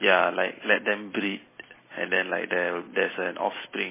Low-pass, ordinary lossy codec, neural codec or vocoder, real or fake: 3.6 kHz; MP3, 16 kbps; none; real